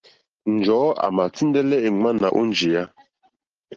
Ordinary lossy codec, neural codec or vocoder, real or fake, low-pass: Opus, 16 kbps; none; real; 7.2 kHz